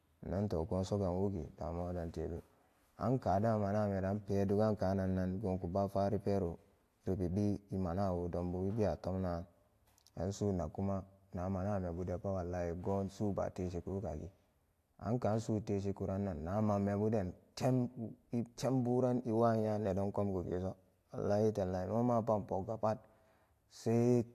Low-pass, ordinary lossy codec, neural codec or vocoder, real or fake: 14.4 kHz; AAC, 64 kbps; none; real